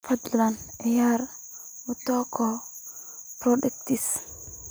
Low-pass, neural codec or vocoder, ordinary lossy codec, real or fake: none; none; none; real